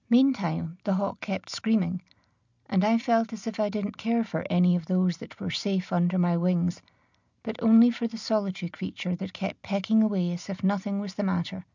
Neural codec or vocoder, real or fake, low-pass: none; real; 7.2 kHz